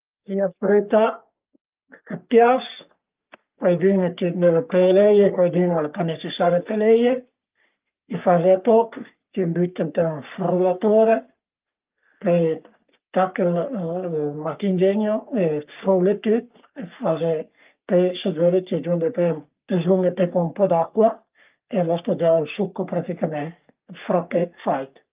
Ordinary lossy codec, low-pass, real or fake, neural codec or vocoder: Opus, 24 kbps; 3.6 kHz; fake; codec, 44.1 kHz, 3.4 kbps, Pupu-Codec